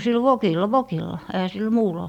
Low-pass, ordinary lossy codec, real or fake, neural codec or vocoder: 19.8 kHz; none; real; none